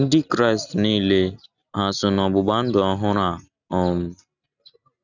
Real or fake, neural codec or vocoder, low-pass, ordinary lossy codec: real; none; 7.2 kHz; none